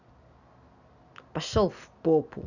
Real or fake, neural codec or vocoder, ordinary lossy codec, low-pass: real; none; none; 7.2 kHz